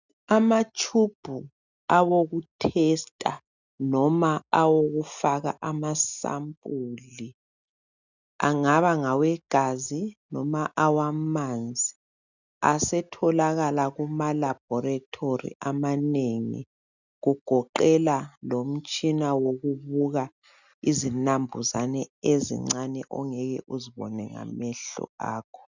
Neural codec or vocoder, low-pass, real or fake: none; 7.2 kHz; real